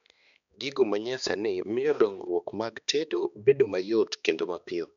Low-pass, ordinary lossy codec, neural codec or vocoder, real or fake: 7.2 kHz; none; codec, 16 kHz, 2 kbps, X-Codec, HuBERT features, trained on balanced general audio; fake